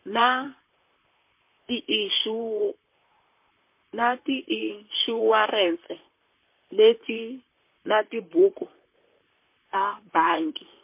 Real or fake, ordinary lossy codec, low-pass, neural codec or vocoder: fake; MP3, 24 kbps; 3.6 kHz; vocoder, 44.1 kHz, 128 mel bands, Pupu-Vocoder